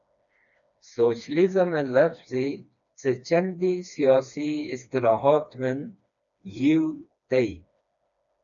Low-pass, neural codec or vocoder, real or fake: 7.2 kHz; codec, 16 kHz, 2 kbps, FreqCodec, smaller model; fake